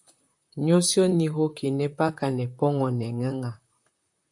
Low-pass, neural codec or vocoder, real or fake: 10.8 kHz; vocoder, 44.1 kHz, 128 mel bands, Pupu-Vocoder; fake